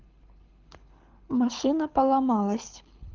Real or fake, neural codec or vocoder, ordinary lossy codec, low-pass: fake; codec, 24 kHz, 6 kbps, HILCodec; Opus, 32 kbps; 7.2 kHz